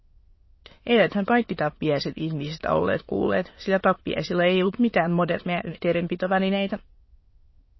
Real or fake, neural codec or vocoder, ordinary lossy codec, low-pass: fake; autoencoder, 22.05 kHz, a latent of 192 numbers a frame, VITS, trained on many speakers; MP3, 24 kbps; 7.2 kHz